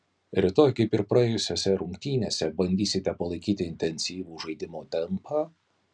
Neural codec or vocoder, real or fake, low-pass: none; real; 9.9 kHz